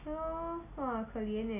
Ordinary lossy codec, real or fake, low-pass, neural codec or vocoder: MP3, 32 kbps; real; 3.6 kHz; none